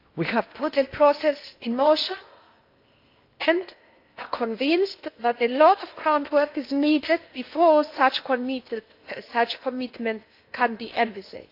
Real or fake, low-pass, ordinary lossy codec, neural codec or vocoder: fake; 5.4 kHz; AAC, 32 kbps; codec, 16 kHz in and 24 kHz out, 0.8 kbps, FocalCodec, streaming, 65536 codes